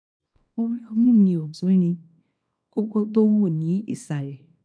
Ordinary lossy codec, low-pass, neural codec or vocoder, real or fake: none; 9.9 kHz; codec, 24 kHz, 0.9 kbps, WavTokenizer, small release; fake